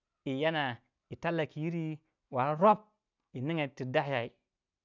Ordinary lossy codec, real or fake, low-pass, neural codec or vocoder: none; real; 7.2 kHz; none